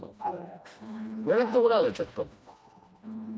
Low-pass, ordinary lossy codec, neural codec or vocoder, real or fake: none; none; codec, 16 kHz, 1 kbps, FreqCodec, smaller model; fake